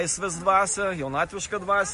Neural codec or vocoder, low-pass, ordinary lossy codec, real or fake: none; 10.8 kHz; MP3, 48 kbps; real